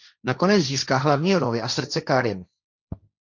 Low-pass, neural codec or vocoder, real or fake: 7.2 kHz; codec, 16 kHz, 1.1 kbps, Voila-Tokenizer; fake